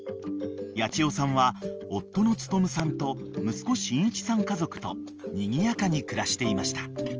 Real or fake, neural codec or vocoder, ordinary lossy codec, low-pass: real; none; Opus, 24 kbps; 7.2 kHz